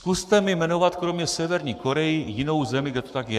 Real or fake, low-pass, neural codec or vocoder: fake; 14.4 kHz; codec, 44.1 kHz, 7.8 kbps, Pupu-Codec